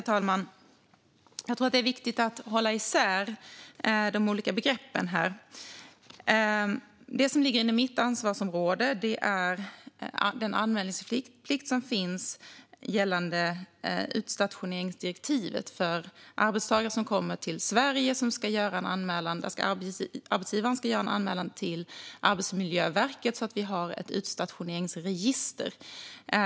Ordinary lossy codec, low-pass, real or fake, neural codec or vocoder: none; none; real; none